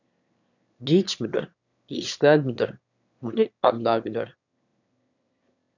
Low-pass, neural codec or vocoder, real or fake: 7.2 kHz; autoencoder, 22.05 kHz, a latent of 192 numbers a frame, VITS, trained on one speaker; fake